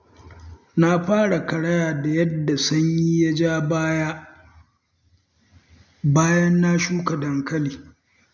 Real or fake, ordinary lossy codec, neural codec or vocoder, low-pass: real; none; none; none